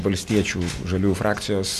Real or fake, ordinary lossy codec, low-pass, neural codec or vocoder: real; MP3, 96 kbps; 14.4 kHz; none